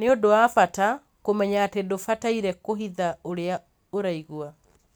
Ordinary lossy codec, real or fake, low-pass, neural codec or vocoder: none; real; none; none